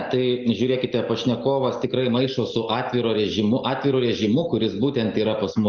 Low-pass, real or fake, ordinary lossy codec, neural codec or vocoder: 7.2 kHz; real; Opus, 24 kbps; none